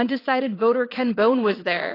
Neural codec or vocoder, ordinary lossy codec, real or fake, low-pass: vocoder, 22.05 kHz, 80 mel bands, WaveNeXt; AAC, 24 kbps; fake; 5.4 kHz